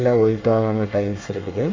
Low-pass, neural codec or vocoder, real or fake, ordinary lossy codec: 7.2 kHz; codec, 24 kHz, 1 kbps, SNAC; fake; MP3, 64 kbps